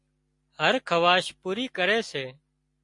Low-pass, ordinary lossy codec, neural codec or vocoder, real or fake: 10.8 kHz; MP3, 48 kbps; none; real